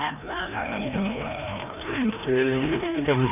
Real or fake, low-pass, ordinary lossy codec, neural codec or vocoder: fake; 3.6 kHz; none; codec, 16 kHz, 1 kbps, FreqCodec, larger model